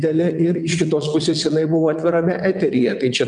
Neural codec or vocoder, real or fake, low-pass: vocoder, 22.05 kHz, 80 mel bands, WaveNeXt; fake; 9.9 kHz